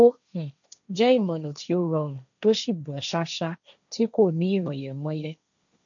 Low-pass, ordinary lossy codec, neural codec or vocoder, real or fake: 7.2 kHz; none; codec, 16 kHz, 1.1 kbps, Voila-Tokenizer; fake